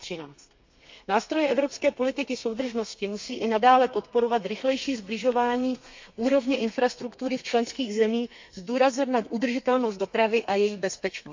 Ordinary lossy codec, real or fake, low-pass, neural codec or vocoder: MP3, 64 kbps; fake; 7.2 kHz; codec, 32 kHz, 1.9 kbps, SNAC